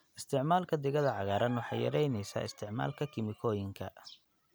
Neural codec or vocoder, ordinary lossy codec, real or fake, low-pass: none; none; real; none